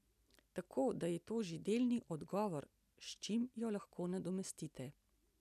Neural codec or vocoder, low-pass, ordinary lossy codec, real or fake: none; 14.4 kHz; none; real